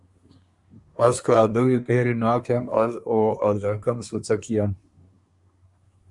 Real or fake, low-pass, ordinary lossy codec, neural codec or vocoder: fake; 10.8 kHz; Opus, 64 kbps; codec, 24 kHz, 1 kbps, SNAC